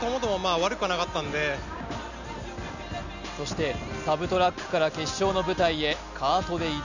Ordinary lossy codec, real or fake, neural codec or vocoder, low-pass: none; real; none; 7.2 kHz